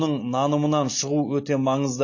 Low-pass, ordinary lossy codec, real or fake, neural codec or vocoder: 7.2 kHz; MP3, 32 kbps; real; none